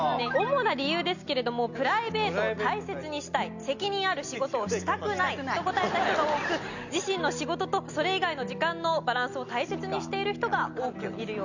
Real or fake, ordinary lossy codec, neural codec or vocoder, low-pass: real; none; none; 7.2 kHz